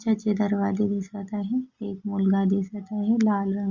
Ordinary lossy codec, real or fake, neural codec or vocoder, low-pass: Opus, 64 kbps; real; none; 7.2 kHz